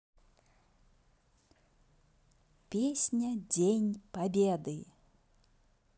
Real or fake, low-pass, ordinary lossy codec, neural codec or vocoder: real; none; none; none